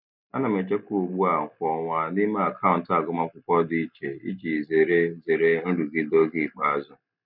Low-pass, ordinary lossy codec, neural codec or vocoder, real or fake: 5.4 kHz; none; none; real